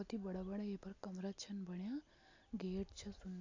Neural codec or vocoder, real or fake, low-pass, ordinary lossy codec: none; real; 7.2 kHz; AAC, 48 kbps